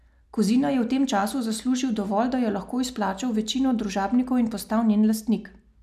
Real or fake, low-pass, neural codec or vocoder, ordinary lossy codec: real; 14.4 kHz; none; none